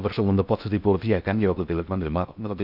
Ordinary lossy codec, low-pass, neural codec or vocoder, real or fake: none; 5.4 kHz; codec, 16 kHz in and 24 kHz out, 0.6 kbps, FocalCodec, streaming, 2048 codes; fake